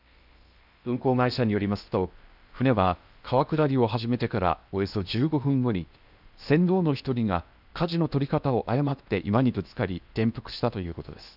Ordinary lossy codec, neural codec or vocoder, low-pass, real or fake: none; codec, 16 kHz in and 24 kHz out, 0.8 kbps, FocalCodec, streaming, 65536 codes; 5.4 kHz; fake